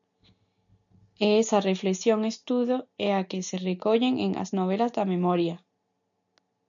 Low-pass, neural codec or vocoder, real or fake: 7.2 kHz; none; real